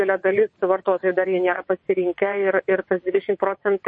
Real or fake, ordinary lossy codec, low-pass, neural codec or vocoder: fake; MP3, 32 kbps; 9.9 kHz; vocoder, 22.05 kHz, 80 mel bands, WaveNeXt